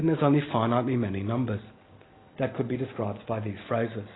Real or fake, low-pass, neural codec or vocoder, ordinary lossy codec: real; 7.2 kHz; none; AAC, 16 kbps